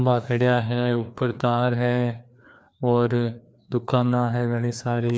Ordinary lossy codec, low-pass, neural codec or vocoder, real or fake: none; none; codec, 16 kHz, 2 kbps, FreqCodec, larger model; fake